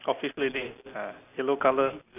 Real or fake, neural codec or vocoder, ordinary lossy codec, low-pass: real; none; none; 3.6 kHz